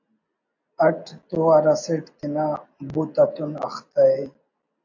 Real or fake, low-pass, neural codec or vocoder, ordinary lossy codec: real; 7.2 kHz; none; AAC, 48 kbps